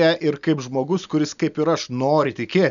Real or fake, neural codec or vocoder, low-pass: real; none; 7.2 kHz